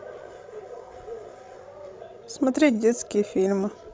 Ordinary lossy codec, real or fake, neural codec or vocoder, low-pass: none; fake; codec, 16 kHz, 16 kbps, FreqCodec, larger model; none